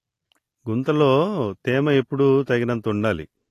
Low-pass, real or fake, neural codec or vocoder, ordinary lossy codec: 14.4 kHz; real; none; AAC, 48 kbps